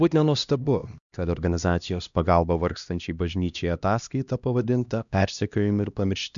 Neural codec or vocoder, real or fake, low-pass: codec, 16 kHz, 1 kbps, X-Codec, HuBERT features, trained on LibriSpeech; fake; 7.2 kHz